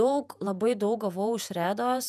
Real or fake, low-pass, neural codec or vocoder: fake; 14.4 kHz; vocoder, 48 kHz, 128 mel bands, Vocos